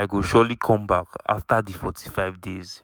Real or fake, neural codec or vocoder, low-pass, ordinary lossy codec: fake; autoencoder, 48 kHz, 128 numbers a frame, DAC-VAE, trained on Japanese speech; none; none